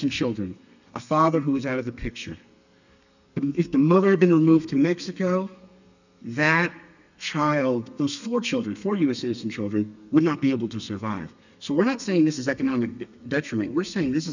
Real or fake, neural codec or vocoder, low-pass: fake; codec, 32 kHz, 1.9 kbps, SNAC; 7.2 kHz